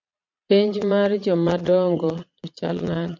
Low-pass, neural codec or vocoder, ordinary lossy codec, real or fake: 7.2 kHz; vocoder, 22.05 kHz, 80 mel bands, Vocos; MP3, 64 kbps; fake